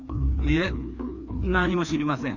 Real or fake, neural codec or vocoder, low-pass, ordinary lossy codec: fake; codec, 16 kHz, 2 kbps, FreqCodec, larger model; 7.2 kHz; none